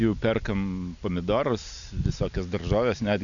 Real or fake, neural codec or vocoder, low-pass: real; none; 7.2 kHz